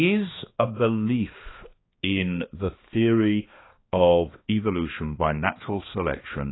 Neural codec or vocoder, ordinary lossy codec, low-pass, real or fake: codec, 16 kHz, 2 kbps, X-Codec, HuBERT features, trained on balanced general audio; AAC, 16 kbps; 7.2 kHz; fake